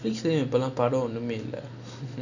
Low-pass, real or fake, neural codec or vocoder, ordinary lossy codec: 7.2 kHz; real; none; none